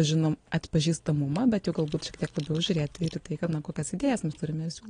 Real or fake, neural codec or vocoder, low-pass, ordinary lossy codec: real; none; 9.9 kHz; AAC, 32 kbps